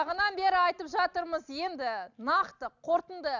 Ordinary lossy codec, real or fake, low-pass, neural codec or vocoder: none; real; 7.2 kHz; none